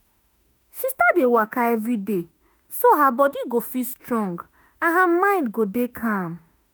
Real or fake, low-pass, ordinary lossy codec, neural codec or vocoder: fake; none; none; autoencoder, 48 kHz, 32 numbers a frame, DAC-VAE, trained on Japanese speech